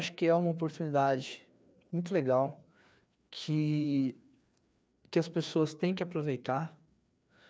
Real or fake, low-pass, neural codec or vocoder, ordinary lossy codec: fake; none; codec, 16 kHz, 2 kbps, FreqCodec, larger model; none